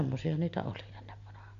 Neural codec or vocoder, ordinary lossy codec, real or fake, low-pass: none; Opus, 64 kbps; real; 7.2 kHz